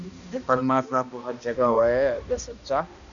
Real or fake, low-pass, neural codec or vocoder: fake; 7.2 kHz; codec, 16 kHz, 1 kbps, X-Codec, HuBERT features, trained on balanced general audio